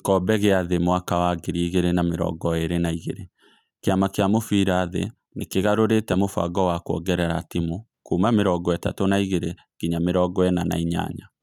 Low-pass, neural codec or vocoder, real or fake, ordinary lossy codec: 19.8 kHz; none; real; none